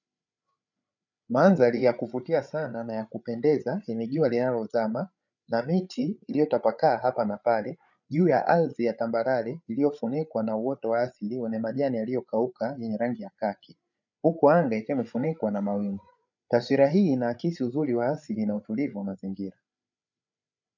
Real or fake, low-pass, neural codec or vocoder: fake; 7.2 kHz; codec, 16 kHz, 8 kbps, FreqCodec, larger model